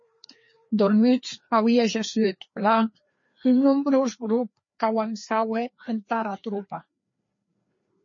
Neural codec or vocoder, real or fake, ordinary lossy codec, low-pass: codec, 16 kHz, 2 kbps, FreqCodec, larger model; fake; MP3, 32 kbps; 7.2 kHz